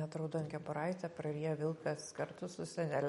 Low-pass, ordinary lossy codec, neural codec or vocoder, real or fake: 19.8 kHz; MP3, 48 kbps; none; real